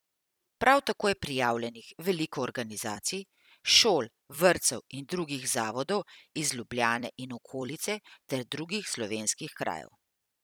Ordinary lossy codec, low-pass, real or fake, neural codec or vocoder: none; none; fake; vocoder, 44.1 kHz, 128 mel bands every 256 samples, BigVGAN v2